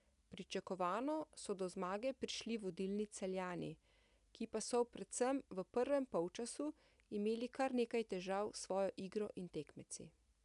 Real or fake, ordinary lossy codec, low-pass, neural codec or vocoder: real; none; 10.8 kHz; none